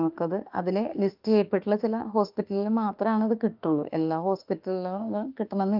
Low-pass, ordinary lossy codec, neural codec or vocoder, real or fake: 5.4 kHz; Opus, 32 kbps; autoencoder, 48 kHz, 32 numbers a frame, DAC-VAE, trained on Japanese speech; fake